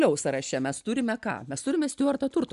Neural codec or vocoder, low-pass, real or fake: none; 10.8 kHz; real